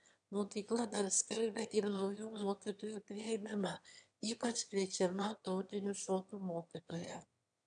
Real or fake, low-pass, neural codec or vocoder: fake; 9.9 kHz; autoencoder, 22.05 kHz, a latent of 192 numbers a frame, VITS, trained on one speaker